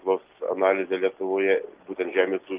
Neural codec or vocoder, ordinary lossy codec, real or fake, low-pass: none; Opus, 32 kbps; real; 3.6 kHz